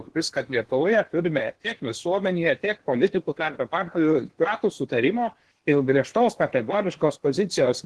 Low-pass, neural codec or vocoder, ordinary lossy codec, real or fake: 10.8 kHz; codec, 16 kHz in and 24 kHz out, 0.8 kbps, FocalCodec, streaming, 65536 codes; Opus, 16 kbps; fake